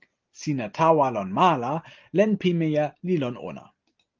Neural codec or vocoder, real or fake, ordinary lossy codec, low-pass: none; real; Opus, 24 kbps; 7.2 kHz